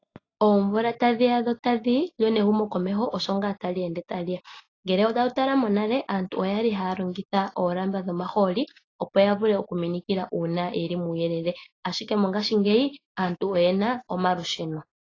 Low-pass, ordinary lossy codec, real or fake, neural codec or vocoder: 7.2 kHz; AAC, 32 kbps; real; none